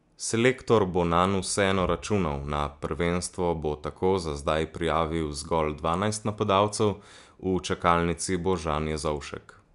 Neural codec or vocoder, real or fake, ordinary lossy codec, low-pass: none; real; MP3, 96 kbps; 10.8 kHz